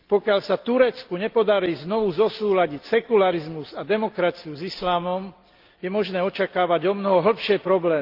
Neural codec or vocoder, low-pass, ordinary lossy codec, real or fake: none; 5.4 kHz; Opus, 32 kbps; real